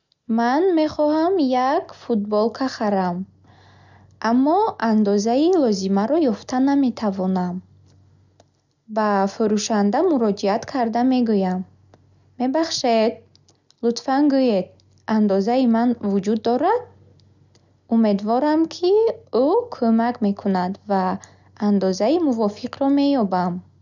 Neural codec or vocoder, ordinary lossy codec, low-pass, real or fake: none; none; 7.2 kHz; real